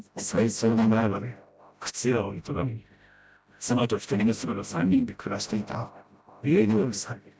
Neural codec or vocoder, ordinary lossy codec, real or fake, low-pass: codec, 16 kHz, 0.5 kbps, FreqCodec, smaller model; none; fake; none